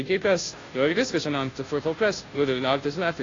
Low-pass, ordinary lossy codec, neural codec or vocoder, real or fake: 7.2 kHz; AAC, 32 kbps; codec, 16 kHz, 0.5 kbps, FunCodec, trained on Chinese and English, 25 frames a second; fake